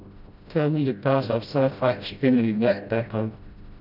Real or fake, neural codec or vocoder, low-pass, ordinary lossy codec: fake; codec, 16 kHz, 0.5 kbps, FreqCodec, smaller model; 5.4 kHz; none